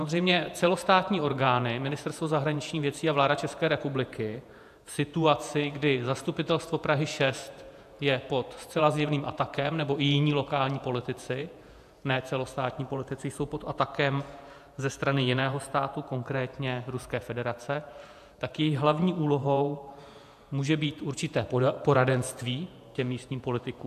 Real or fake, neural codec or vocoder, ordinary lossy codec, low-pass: fake; vocoder, 48 kHz, 128 mel bands, Vocos; AAC, 96 kbps; 14.4 kHz